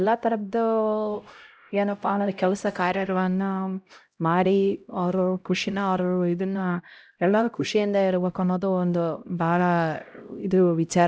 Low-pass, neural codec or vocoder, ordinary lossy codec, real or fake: none; codec, 16 kHz, 0.5 kbps, X-Codec, HuBERT features, trained on LibriSpeech; none; fake